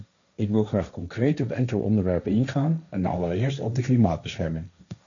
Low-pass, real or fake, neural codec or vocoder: 7.2 kHz; fake; codec, 16 kHz, 1.1 kbps, Voila-Tokenizer